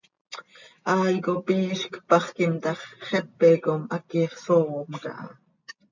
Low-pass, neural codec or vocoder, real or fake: 7.2 kHz; none; real